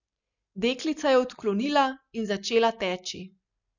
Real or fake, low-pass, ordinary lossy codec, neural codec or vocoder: real; 7.2 kHz; none; none